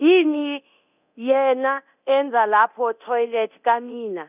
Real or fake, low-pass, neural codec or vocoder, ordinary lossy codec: fake; 3.6 kHz; codec, 24 kHz, 0.9 kbps, DualCodec; none